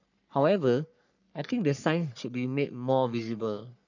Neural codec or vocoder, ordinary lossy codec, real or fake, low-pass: codec, 44.1 kHz, 3.4 kbps, Pupu-Codec; none; fake; 7.2 kHz